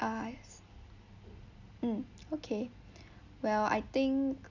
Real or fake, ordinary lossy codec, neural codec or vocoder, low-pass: real; none; none; 7.2 kHz